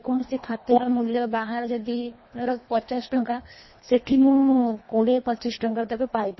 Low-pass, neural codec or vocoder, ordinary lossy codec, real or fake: 7.2 kHz; codec, 24 kHz, 1.5 kbps, HILCodec; MP3, 24 kbps; fake